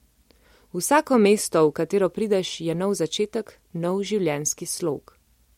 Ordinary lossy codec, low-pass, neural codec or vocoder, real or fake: MP3, 64 kbps; 19.8 kHz; none; real